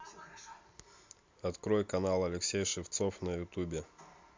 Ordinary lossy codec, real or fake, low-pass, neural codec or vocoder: MP3, 64 kbps; real; 7.2 kHz; none